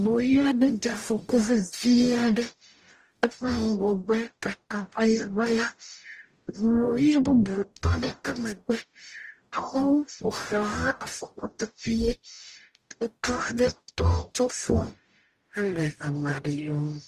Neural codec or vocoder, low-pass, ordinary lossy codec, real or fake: codec, 44.1 kHz, 0.9 kbps, DAC; 14.4 kHz; Opus, 24 kbps; fake